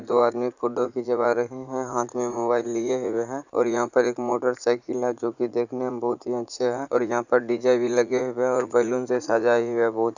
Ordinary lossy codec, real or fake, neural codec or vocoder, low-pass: none; fake; vocoder, 44.1 kHz, 80 mel bands, Vocos; 7.2 kHz